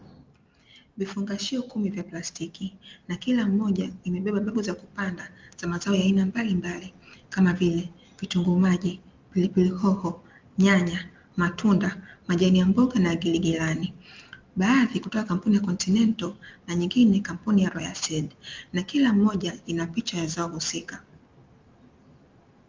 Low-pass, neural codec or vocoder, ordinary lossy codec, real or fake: 7.2 kHz; none; Opus, 24 kbps; real